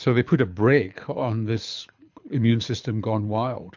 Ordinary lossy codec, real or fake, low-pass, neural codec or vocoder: MP3, 64 kbps; fake; 7.2 kHz; codec, 24 kHz, 6 kbps, HILCodec